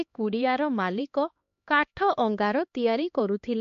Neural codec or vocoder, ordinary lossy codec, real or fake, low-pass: codec, 16 kHz, 2 kbps, X-Codec, HuBERT features, trained on LibriSpeech; MP3, 48 kbps; fake; 7.2 kHz